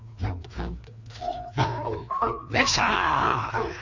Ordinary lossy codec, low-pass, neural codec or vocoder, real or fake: MP3, 48 kbps; 7.2 kHz; codec, 16 kHz, 2 kbps, FreqCodec, larger model; fake